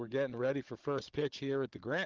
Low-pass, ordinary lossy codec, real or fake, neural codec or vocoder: 7.2 kHz; Opus, 16 kbps; fake; codec, 16 kHz, 16 kbps, FunCodec, trained on Chinese and English, 50 frames a second